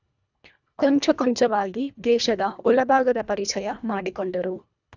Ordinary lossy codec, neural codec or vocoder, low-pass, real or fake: none; codec, 24 kHz, 1.5 kbps, HILCodec; 7.2 kHz; fake